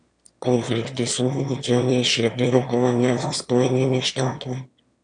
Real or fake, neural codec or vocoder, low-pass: fake; autoencoder, 22.05 kHz, a latent of 192 numbers a frame, VITS, trained on one speaker; 9.9 kHz